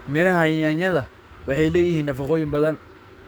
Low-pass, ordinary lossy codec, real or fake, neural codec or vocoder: none; none; fake; codec, 44.1 kHz, 2.6 kbps, SNAC